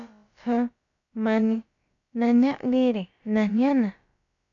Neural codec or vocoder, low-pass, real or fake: codec, 16 kHz, about 1 kbps, DyCAST, with the encoder's durations; 7.2 kHz; fake